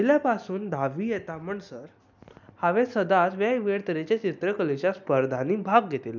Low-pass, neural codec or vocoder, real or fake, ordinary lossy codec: 7.2 kHz; none; real; none